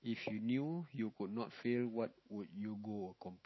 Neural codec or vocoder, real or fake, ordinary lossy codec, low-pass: autoencoder, 48 kHz, 128 numbers a frame, DAC-VAE, trained on Japanese speech; fake; MP3, 24 kbps; 7.2 kHz